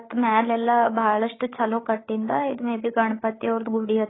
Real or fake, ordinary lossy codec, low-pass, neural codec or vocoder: fake; AAC, 16 kbps; 7.2 kHz; codec, 16 kHz, 8 kbps, FreqCodec, larger model